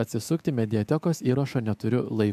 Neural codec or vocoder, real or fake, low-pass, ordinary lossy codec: autoencoder, 48 kHz, 128 numbers a frame, DAC-VAE, trained on Japanese speech; fake; 14.4 kHz; MP3, 96 kbps